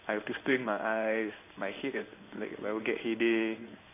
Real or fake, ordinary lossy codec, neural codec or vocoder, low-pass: fake; none; codec, 16 kHz in and 24 kHz out, 1 kbps, XY-Tokenizer; 3.6 kHz